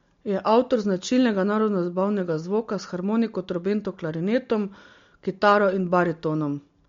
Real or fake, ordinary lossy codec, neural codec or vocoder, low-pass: real; MP3, 48 kbps; none; 7.2 kHz